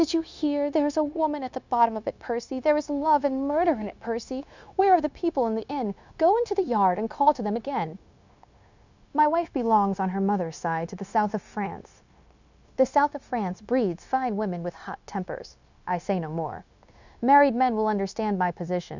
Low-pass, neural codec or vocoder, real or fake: 7.2 kHz; codec, 16 kHz, 0.9 kbps, LongCat-Audio-Codec; fake